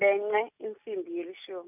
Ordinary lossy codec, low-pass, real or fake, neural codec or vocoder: none; 3.6 kHz; real; none